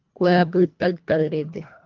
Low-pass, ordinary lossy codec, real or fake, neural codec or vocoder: 7.2 kHz; Opus, 32 kbps; fake; codec, 24 kHz, 1.5 kbps, HILCodec